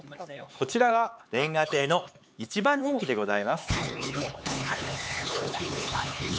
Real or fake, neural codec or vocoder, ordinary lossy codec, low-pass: fake; codec, 16 kHz, 4 kbps, X-Codec, HuBERT features, trained on LibriSpeech; none; none